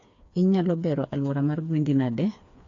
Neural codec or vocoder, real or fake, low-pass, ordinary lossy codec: codec, 16 kHz, 4 kbps, FreqCodec, smaller model; fake; 7.2 kHz; AAC, 48 kbps